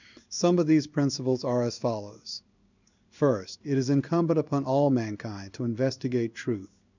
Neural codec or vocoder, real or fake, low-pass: codec, 16 kHz in and 24 kHz out, 1 kbps, XY-Tokenizer; fake; 7.2 kHz